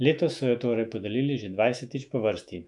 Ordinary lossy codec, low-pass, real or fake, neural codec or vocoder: none; 10.8 kHz; fake; autoencoder, 48 kHz, 128 numbers a frame, DAC-VAE, trained on Japanese speech